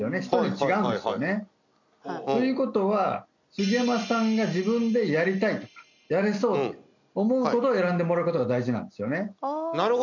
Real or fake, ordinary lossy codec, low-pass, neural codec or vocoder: real; none; 7.2 kHz; none